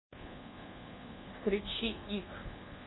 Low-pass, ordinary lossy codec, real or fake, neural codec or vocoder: 7.2 kHz; AAC, 16 kbps; fake; codec, 24 kHz, 1.2 kbps, DualCodec